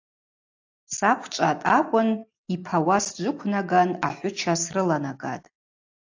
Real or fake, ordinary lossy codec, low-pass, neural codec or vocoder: real; AAC, 48 kbps; 7.2 kHz; none